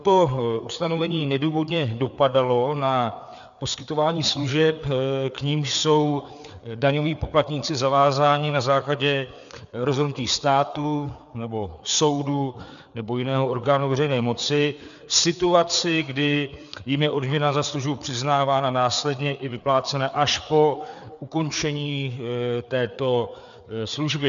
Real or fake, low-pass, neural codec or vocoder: fake; 7.2 kHz; codec, 16 kHz, 4 kbps, FreqCodec, larger model